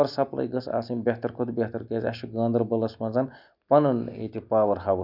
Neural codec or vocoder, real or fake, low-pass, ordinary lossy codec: none; real; 5.4 kHz; none